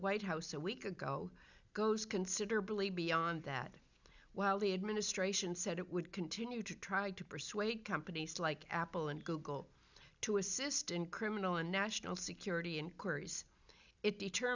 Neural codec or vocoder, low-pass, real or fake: none; 7.2 kHz; real